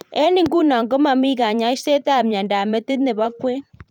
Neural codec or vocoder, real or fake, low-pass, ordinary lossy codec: vocoder, 44.1 kHz, 128 mel bands every 512 samples, BigVGAN v2; fake; 19.8 kHz; none